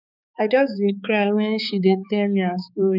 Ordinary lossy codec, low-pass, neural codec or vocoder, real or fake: none; 5.4 kHz; codec, 16 kHz, 4 kbps, X-Codec, HuBERT features, trained on balanced general audio; fake